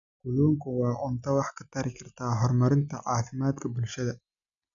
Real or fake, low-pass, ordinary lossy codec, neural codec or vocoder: real; 7.2 kHz; none; none